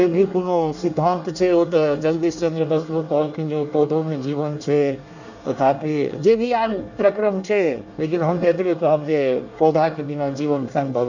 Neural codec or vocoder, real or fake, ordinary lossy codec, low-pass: codec, 24 kHz, 1 kbps, SNAC; fake; none; 7.2 kHz